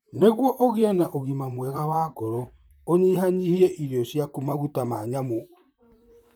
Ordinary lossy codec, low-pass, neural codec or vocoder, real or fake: none; none; vocoder, 44.1 kHz, 128 mel bands, Pupu-Vocoder; fake